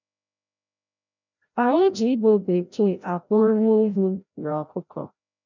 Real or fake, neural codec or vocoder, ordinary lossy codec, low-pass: fake; codec, 16 kHz, 0.5 kbps, FreqCodec, larger model; none; 7.2 kHz